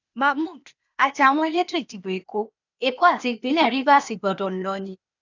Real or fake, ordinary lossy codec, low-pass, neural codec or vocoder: fake; none; 7.2 kHz; codec, 16 kHz, 0.8 kbps, ZipCodec